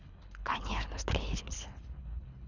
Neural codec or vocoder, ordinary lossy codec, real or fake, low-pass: codec, 24 kHz, 3 kbps, HILCodec; none; fake; 7.2 kHz